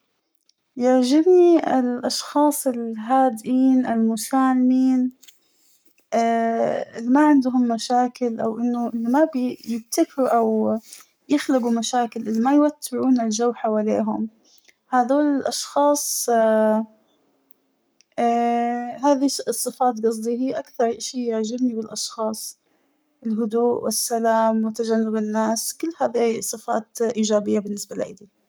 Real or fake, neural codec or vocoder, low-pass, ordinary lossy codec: fake; codec, 44.1 kHz, 7.8 kbps, Pupu-Codec; none; none